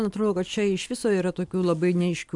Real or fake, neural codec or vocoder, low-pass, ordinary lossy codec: real; none; 10.8 kHz; MP3, 96 kbps